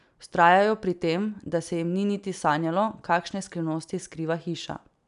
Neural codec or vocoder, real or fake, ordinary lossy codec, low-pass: none; real; none; 10.8 kHz